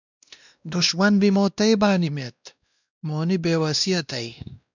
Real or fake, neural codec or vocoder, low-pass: fake; codec, 16 kHz, 1 kbps, X-Codec, WavLM features, trained on Multilingual LibriSpeech; 7.2 kHz